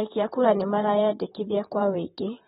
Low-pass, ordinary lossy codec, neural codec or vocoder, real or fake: 19.8 kHz; AAC, 16 kbps; vocoder, 44.1 kHz, 128 mel bands, Pupu-Vocoder; fake